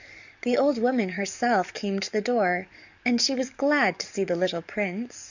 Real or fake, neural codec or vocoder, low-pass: fake; codec, 44.1 kHz, 7.8 kbps, DAC; 7.2 kHz